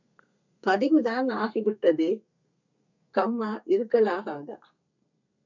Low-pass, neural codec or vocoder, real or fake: 7.2 kHz; codec, 32 kHz, 1.9 kbps, SNAC; fake